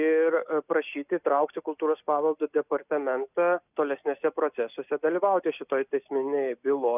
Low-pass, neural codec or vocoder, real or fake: 3.6 kHz; none; real